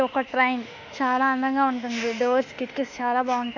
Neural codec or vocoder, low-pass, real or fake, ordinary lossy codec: autoencoder, 48 kHz, 32 numbers a frame, DAC-VAE, trained on Japanese speech; 7.2 kHz; fake; none